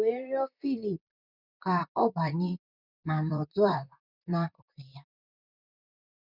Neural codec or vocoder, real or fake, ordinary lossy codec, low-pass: vocoder, 44.1 kHz, 128 mel bands, Pupu-Vocoder; fake; none; 5.4 kHz